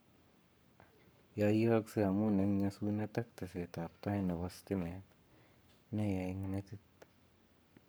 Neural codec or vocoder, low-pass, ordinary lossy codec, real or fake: codec, 44.1 kHz, 7.8 kbps, Pupu-Codec; none; none; fake